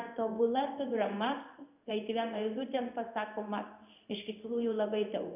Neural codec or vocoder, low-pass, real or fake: codec, 16 kHz in and 24 kHz out, 1 kbps, XY-Tokenizer; 3.6 kHz; fake